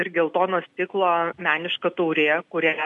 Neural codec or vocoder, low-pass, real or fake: none; 10.8 kHz; real